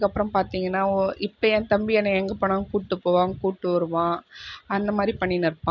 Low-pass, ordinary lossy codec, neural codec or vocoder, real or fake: 7.2 kHz; none; none; real